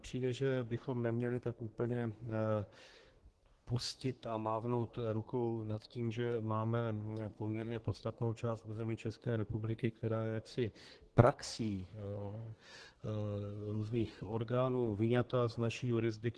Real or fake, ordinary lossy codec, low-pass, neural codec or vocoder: fake; Opus, 16 kbps; 10.8 kHz; codec, 24 kHz, 1 kbps, SNAC